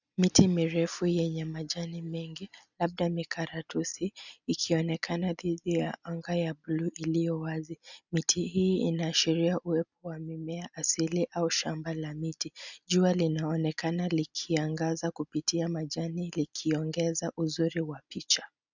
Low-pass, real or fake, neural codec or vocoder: 7.2 kHz; real; none